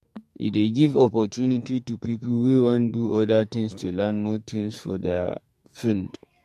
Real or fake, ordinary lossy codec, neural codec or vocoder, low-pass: fake; MP3, 64 kbps; codec, 44.1 kHz, 2.6 kbps, SNAC; 14.4 kHz